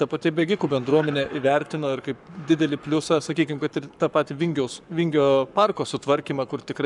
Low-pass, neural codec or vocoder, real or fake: 10.8 kHz; codec, 44.1 kHz, 7.8 kbps, Pupu-Codec; fake